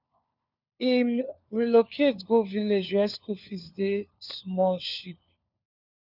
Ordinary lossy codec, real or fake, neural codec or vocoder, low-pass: none; fake; codec, 16 kHz, 4 kbps, FunCodec, trained on LibriTTS, 50 frames a second; 5.4 kHz